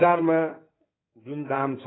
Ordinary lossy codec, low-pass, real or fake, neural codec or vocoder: AAC, 16 kbps; 7.2 kHz; fake; codec, 16 kHz in and 24 kHz out, 2.2 kbps, FireRedTTS-2 codec